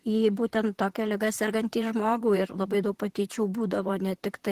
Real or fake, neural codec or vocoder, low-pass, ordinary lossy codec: fake; vocoder, 44.1 kHz, 128 mel bands, Pupu-Vocoder; 14.4 kHz; Opus, 16 kbps